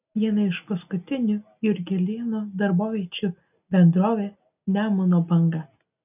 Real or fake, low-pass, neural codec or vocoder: real; 3.6 kHz; none